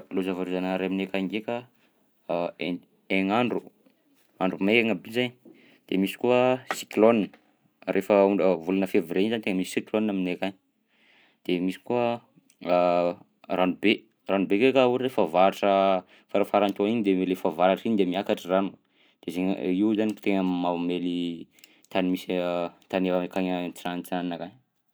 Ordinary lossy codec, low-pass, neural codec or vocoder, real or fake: none; none; none; real